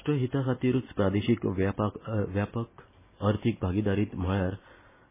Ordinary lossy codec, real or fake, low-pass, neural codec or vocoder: MP3, 16 kbps; real; 3.6 kHz; none